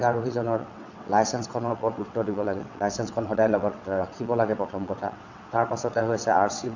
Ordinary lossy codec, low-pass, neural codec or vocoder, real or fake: none; 7.2 kHz; vocoder, 22.05 kHz, 80 mel bands, WaveNeXt; fake